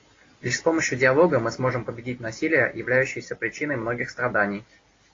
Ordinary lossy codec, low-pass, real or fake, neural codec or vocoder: AAC, 32 kbps; 7.2 kHz; real; none